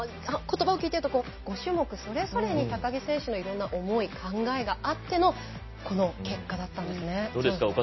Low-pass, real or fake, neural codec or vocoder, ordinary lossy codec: 7.2 kHz; real; none; MP3, 24 kbps